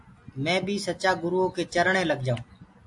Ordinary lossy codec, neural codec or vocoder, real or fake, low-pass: AAC, 64 kbps; none; real; 10.8 kHz